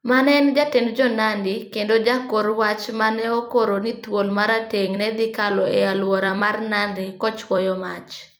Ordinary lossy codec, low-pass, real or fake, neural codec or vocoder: none; none; fake; vocoder, 44.1 kHz, 128 mel bands every 256 samples, BigVGAN v2